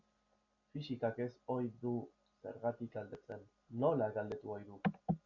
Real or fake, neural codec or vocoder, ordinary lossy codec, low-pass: real; none; MP3, 48 kbps; 7.2 kHz